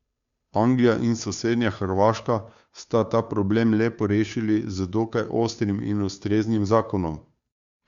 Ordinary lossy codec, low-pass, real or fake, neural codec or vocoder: Opus, 64 kbps; 7.2 kHz; fake; codec, 16 kHz, 2 kbps, FunCodec, trained on Chinese and English, 25 frames a second